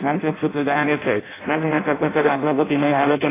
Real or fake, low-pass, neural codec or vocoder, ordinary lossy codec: fake; 3.6 kHz; codec, 16 kHz in and 24 kHz out, 0.6 kbps, FireRedTTS-2 codec; AAC, 16 kbps